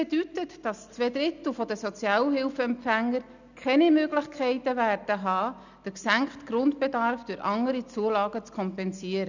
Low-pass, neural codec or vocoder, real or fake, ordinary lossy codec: 7.2 kHz; none; real; none